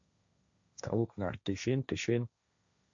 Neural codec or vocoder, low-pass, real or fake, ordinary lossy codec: codec, 16 kHz, 1.1 kbps, Voila-Tokenizer; 7.2 kHz; fake; AAC, 64 kbps